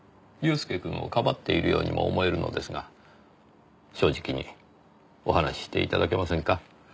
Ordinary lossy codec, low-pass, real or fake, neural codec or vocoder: none; none; real; none